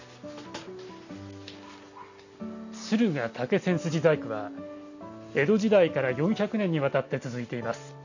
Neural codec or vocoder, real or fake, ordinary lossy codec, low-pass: none; real; none; 7.2 kHz